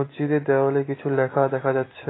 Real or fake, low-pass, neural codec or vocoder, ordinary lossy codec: real; 7.2 kHz; none; AAC, 16 kbps